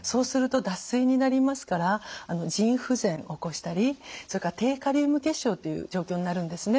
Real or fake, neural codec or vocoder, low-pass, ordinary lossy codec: real; none; none; none